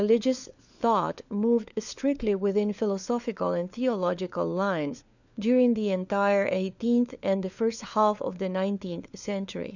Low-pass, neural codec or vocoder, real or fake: 7.2 kHz; codec, 16 kHz, 4 kbps, FunCodec, trained on LibriTTS, 50 frames a second; fake